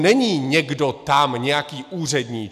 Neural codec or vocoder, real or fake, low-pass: none; real; 14.4 kHz